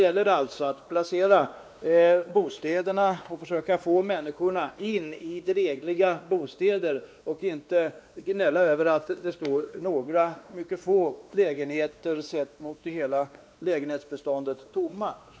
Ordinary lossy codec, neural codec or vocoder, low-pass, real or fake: none; codec, 16 kHz, 2 kbps, X-Codec, WavLM features, trained on Multilingual LibriSpeech; none; fake